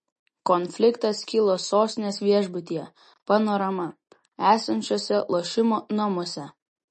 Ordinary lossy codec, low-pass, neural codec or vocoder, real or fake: MP3, 32 kbps; 10.8 kHz; none; real